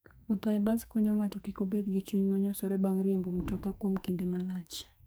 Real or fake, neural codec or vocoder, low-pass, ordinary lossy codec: fake; codec, 44.1 kHz, 2.6 kbps, SNAC; none; none